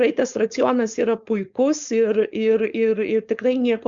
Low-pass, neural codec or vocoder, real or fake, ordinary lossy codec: 7.2 kHz; codec, 16 kHz, 4.8 kbps, FACodec; fake; Opus, 64 kbps